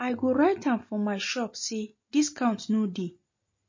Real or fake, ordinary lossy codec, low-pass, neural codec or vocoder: real; MP3, 32 kbps; 7.2 kHz; none